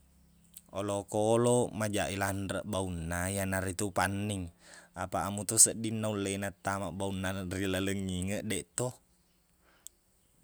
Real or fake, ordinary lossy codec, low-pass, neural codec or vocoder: real; none; none; none